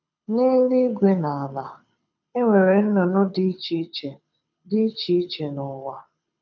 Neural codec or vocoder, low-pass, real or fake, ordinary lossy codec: codec, 24 kHz, 6 kbps, HILCodec; 7.2 kHz; fake; none